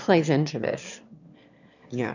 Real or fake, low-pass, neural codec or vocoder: fake; 7.2 kHz; autoencoder, 22.05 kHz, a latent of 192 numbers a frame, VITS, trained on one speaker